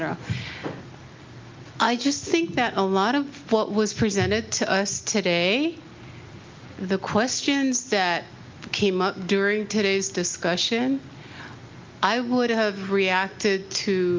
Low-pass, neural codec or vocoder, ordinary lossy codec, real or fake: 7.2 kHz; none; Opus, 24 kbps; real